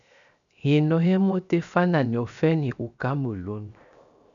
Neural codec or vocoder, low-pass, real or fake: codec, 16 kHz, 0.7 kbps, FocalCodec; 7.2 kHz; fake